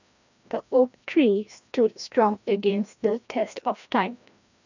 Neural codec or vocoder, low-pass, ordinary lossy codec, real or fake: codec, 16 kHz, 1 kbps, FreqCodec, larger model; 7.2 kHz; none; fake